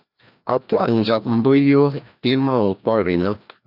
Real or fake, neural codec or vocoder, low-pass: fake; codec, 16 kHz, 1 kbps, FreqCodec, larger model; 5.4 kHz